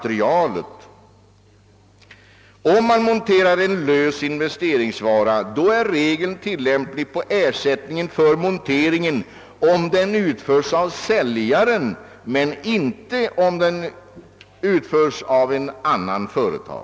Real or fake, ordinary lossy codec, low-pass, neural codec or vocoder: real; none; none; none